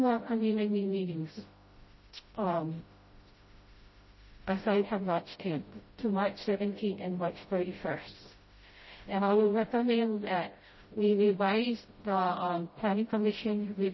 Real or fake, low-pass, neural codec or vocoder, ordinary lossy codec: fake; 7.2 kHz; codec, 16 kHz, 0.5 kbps, FreqCodec, smaller model; MP3, 24 kbps